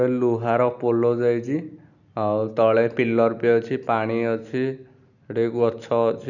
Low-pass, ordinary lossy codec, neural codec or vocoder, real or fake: 7.2 kHz; none; none; real